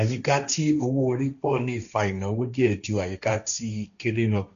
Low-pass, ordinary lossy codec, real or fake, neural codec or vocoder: 7.2 kHz; none; fake; codec, 16 kHz, 1.1 kbps, Voila-Tokenizer